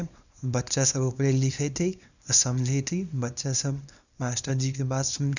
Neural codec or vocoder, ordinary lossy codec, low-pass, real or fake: codec, 24 kHz, 0.9 kbps, WavTokenizer, small release; none; 7.2 kHz; fake